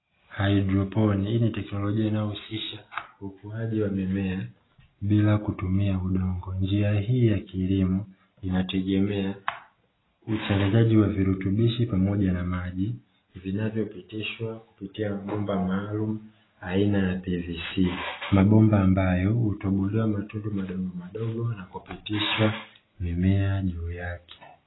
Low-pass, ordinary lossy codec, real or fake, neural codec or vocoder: 7.2 kHz; AAC, 16 kbps; real; none